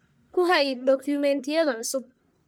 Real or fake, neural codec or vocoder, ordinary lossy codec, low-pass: fake; codec, 44.1 kHz, 1.7 kbps, Pupu-Codec; none; none